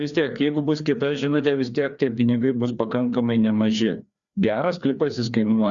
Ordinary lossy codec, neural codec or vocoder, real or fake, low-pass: Opus, 64 kbps; codec, 16 kHz, 2 kbps, FreqCodec, larger model; fake; 7.2 kHz